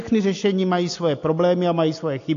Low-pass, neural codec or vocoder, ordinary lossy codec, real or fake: 7.2 kHz; none; AAC, 48 kbps; real